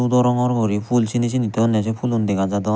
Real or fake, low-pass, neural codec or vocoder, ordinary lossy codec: real; none; none; none